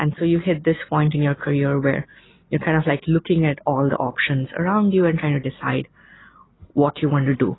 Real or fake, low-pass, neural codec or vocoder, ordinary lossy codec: real; 7.2 kHz; none; AAC, 16 kbps